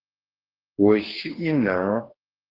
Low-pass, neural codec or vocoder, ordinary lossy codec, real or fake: 5.4 kHz; codec, 44.1 kHz, 2.6 kbps, DAC; Opus, 16 kbps; fake